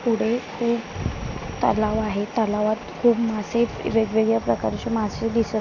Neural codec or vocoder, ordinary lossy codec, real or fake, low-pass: none; none; real; 7.2 kHz